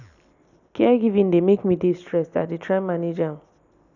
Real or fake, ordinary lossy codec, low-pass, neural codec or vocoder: real; none; 7.2 kHz; none